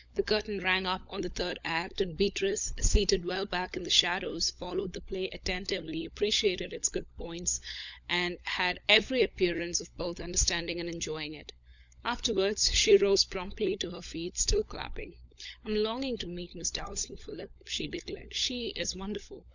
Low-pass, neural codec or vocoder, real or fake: 7.2 kHz; codec, 16 kHz, 16 kbps, FunCodec, trained on LibriTTS, 50 frames a second; fake